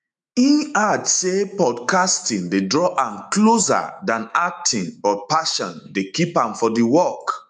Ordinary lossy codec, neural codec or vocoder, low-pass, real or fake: none; autoencoder, 48 kHz, 128 numbers a frame, DAC-VAE, trained on Japanese speech; 10.8 kHz; fake